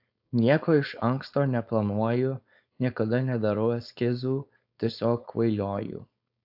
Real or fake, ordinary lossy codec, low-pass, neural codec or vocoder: fake; AAC, 48 kbps; 5.4 kHz; codec, 16 kHz, 4.8 kbps, FACodec